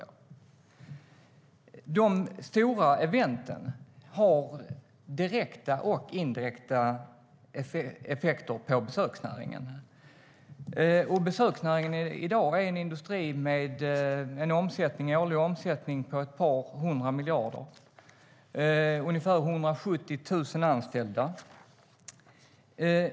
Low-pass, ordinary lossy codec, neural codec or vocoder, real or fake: none; none; none; real